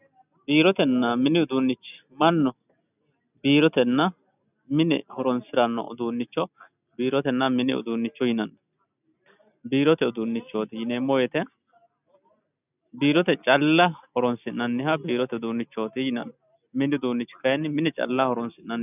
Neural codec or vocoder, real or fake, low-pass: none; real; 3.6 kHz